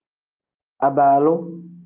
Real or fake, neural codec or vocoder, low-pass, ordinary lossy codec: real; none; 3.6 kHz; Opus, 32 kbps